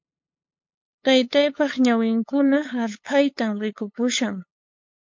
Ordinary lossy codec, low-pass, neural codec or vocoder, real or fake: MP3, 48 kbps; 7.2 kHz; codec, 16 kHz, 8 kbps, FunCodec, trained on LibriTTS, 25 frames a second; fake